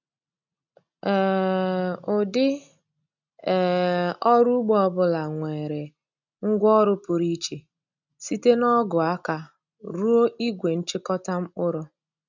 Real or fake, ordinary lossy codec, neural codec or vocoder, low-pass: real; none; none; 7.2 kHz